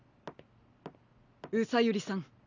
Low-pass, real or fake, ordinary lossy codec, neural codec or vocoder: 7.2 kHz; real; none; none